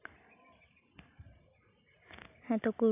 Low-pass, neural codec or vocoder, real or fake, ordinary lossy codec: 3.6 kHz; none; real; AAC, 32 kbps